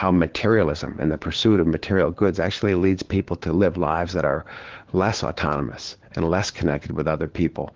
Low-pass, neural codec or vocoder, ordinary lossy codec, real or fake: 7.2 kHz; codec, 16 kHz, 4 kbps, FunCodec, trained on LibriTTS, 50 frames a second; Opus, 16 kbps; fake